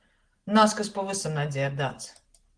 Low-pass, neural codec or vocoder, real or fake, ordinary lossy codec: 9.9 kHz; none; real; Opus, 16 kbps